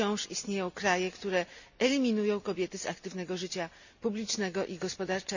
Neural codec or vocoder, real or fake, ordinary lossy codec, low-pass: none; real; none; 7.2 kHz